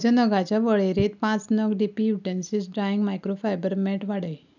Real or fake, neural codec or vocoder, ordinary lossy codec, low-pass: real; none; none; 7.2 kHz